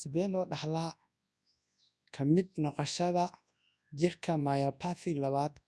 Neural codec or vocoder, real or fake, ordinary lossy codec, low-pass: codec, 24 kHz, 0.9 kbps, WavTokenizer, large speech release; fake; none; none